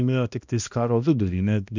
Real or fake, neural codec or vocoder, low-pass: fake; codec, 16 kHz, 1 kbps, X-Codec, HuBERT features, trained on balanced general audio; 7.2 kHz